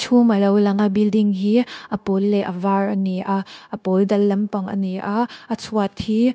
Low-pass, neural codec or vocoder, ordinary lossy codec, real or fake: none; codec, 16 kHz, 0.9 kbps, LongCat-Audio-Codec; none; fake